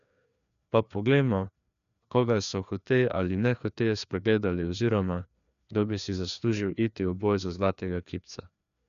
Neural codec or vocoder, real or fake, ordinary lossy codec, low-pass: codec, 16 kHz, 2 kbps, FreqCodec, larger model; fake; none; 7.2 kHz